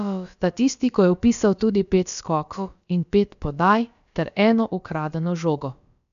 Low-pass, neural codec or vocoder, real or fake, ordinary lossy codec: 7.2 kHz; codec, 16 kHz, about 1 kbps, DyCAST, with the encoder's durations; fake; none